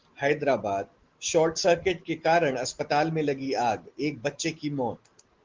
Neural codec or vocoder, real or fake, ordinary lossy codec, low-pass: none; real; Opus, 16 kbps; 7.2 kHz